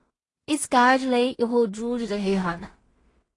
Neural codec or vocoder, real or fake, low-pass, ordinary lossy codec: codec, 16 kHz in and 24 kHz out, 0.4 kbps, LongCat-Audio-Codec, two codebook decoder; fake; 10.8 kHz; AAC, 32 kbps